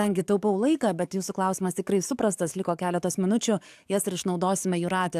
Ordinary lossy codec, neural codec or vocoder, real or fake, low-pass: AAC, 96 kbps; codec, 44.1 kHz, 7.8 kbps, DAC; fake; 14.4 kHz